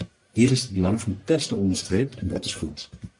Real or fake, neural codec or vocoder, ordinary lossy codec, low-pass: fake; codec, 44.1 kHz, 1.7 kbps, Pupu-Codec; MP3, 64 kbps; 10.8 kHz